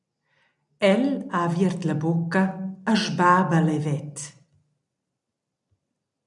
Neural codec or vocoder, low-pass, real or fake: none; 10.8 kHz; real